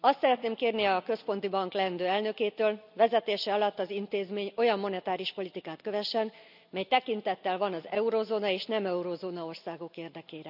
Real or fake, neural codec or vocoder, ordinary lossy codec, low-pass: real; none; none; 5.4 kHz